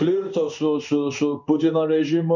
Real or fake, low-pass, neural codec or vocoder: fake; 7.2 kHz; codec, 16 kHz in and 24 kHz out, 1 kbps, XY-Tokenizer